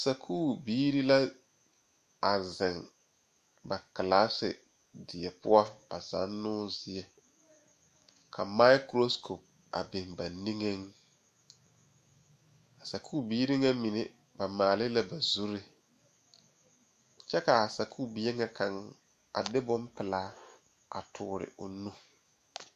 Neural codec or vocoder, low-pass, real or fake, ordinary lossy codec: autoencoder, 48 kHz, 128 numbers a frame, DAC-VAE, trained on Japanese speech; 14.4 kHz; fake; MP3, 64 kbps